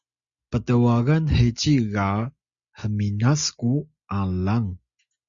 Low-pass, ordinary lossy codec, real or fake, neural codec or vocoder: 7.2 kHz; Opus, 64 kbps; real; none